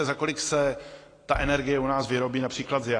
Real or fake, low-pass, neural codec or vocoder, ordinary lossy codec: real; 9.9 kHz; none; AAC, 32 kbps